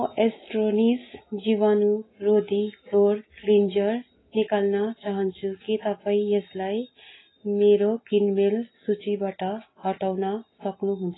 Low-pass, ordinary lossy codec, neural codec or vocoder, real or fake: 7.2 kHz; AAC, 16 kbps; none; real